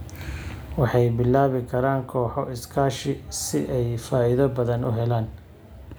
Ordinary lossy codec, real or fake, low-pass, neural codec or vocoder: none; real; none; none